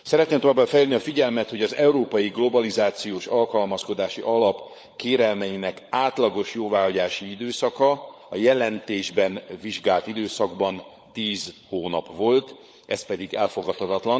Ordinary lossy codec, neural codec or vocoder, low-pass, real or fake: none; codec, 16 kHz, 16 kbps, FunCodec, trained on LibriTTS, 50 frames a second; none; fake